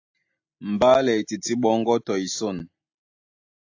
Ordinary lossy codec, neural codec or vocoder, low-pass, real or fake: AAC, 48 kbps; none; 7.2 kHz; real